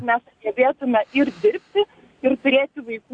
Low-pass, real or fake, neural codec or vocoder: 9.9 kHz; real; none